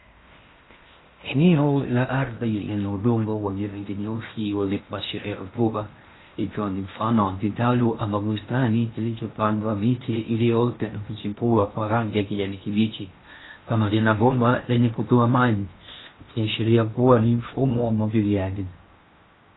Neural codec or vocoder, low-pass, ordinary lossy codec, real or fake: codec, 16 kHz in and 24 kHz out, 0.6 kbps, FocalCodec, streaming, 2048 codes; 7.2 kHz; AAC, 16 kbps; fake